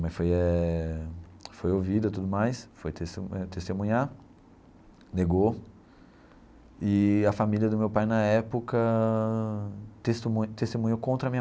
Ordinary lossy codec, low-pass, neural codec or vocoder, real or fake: none; none; none; real